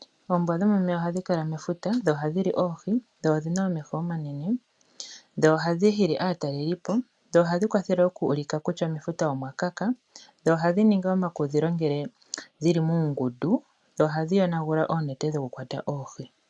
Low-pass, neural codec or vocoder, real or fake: 10.8 kHz; none; real